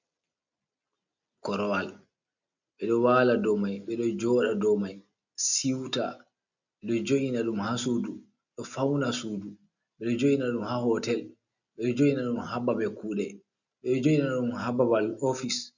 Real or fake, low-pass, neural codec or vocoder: real; 7.2 kHz; none